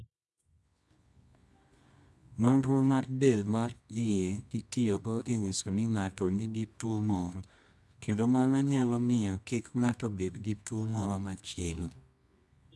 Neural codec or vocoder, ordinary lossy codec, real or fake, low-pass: codec, 24 kHz, 0.9 kbps, WavTokenizer, medium music audio release; none; fake; none